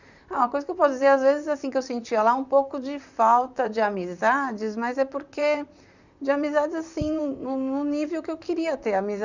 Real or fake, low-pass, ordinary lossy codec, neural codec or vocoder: fake; 7.2 kHz; none; vocoder, 44.1 kHz, 128 mel bands, Pupu-Vocoder